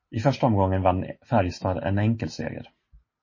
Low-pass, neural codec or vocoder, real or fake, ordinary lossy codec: 7.2 kHz; none; real; MP3, 32 kbps